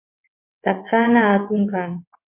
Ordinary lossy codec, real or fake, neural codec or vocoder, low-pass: MP3, 24 kbps; fake; vocoder, 22.05 kHz, 80 mel bands, WaveNeXt; 3.6 kHz